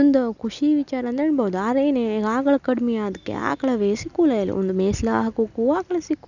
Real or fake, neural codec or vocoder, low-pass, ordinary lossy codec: real; none; 7.2 kHz; none